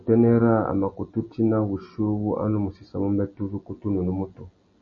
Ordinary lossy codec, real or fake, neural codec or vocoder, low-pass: MP3, 32 kbps; real; none; 7.2 kHz